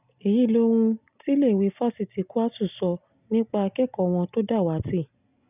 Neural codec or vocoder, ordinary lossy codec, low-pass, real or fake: none; none; 3.6 kHz; real